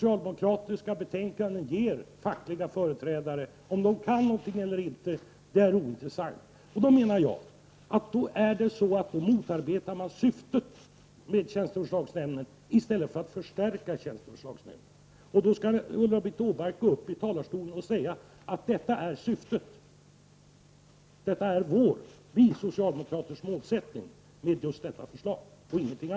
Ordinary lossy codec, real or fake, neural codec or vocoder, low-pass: none; real; none; none